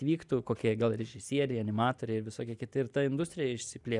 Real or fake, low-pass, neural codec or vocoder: real; 10.8 kHz; none